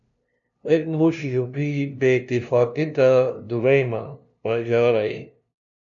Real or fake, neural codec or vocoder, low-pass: fake; codec, 16 kHz, 0.5 kbps, FunCodec, trained on LibriTTS, 25 frames a second; 7.2 kHz